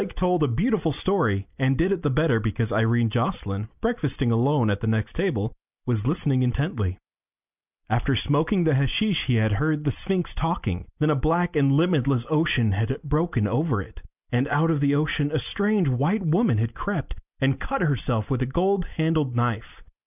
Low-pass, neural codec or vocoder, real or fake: 3.6 kHz; none; real